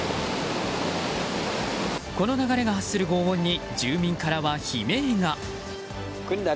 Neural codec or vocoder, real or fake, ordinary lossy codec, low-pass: none; real; none; none